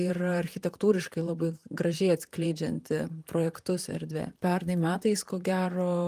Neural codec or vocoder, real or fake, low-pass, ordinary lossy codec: vocoder, 44.1 kHz, 128 mel bands, Pupu-Vocoder; fake; 14.4 kHz; Opus, 32 kbps